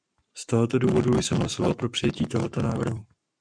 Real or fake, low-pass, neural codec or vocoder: fake; 9.9 kHz; codec, 44.1 kHz, 7.8 kbps, Pupu-Codec